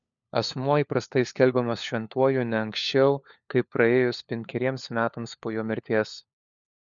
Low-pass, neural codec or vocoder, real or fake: 7.2 kHz; codec, 16 kHz, 4 kbps, FunCodec, trained on LibriTTS, 50 frames a second; fake